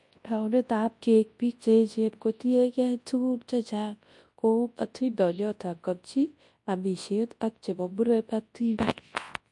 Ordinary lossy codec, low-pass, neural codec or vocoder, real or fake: MP3, 48 kbps; 10.8 kHz; codec, 24 kHz, 0.9 kbps, WavTokenizer, large speech release; fake